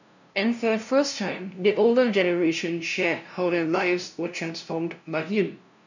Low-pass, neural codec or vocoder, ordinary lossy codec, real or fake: 7.2 kHz; codec, 16 kHz, 0.5 kbps, FunCodec, trained on LibriTTS, 25 frames a second; none; fake